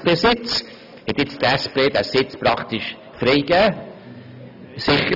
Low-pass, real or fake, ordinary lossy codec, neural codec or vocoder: 5.4 kHz; real; none; none